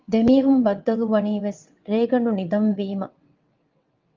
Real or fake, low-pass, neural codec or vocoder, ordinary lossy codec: fake; 7.2 kHz; vocoder, 22.05 kHz, 80 mel bands, WaveNeXt; Opus, 24 kbps